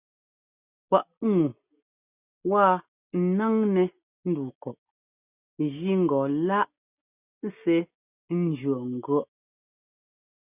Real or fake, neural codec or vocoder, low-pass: real; none; 3.6 kHz